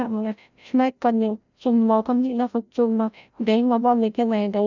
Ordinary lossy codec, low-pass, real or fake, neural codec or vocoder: none; 7.2 kHz; fake; codec, 16 kHz, 0.5 kbps, FreqCodec, larger model